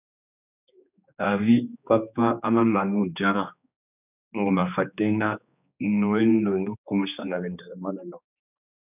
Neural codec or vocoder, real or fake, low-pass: codec, 16 kHz, 2 kbps, X-Codec, HuBERT features, trained on general audio; fake; 3.6 kHz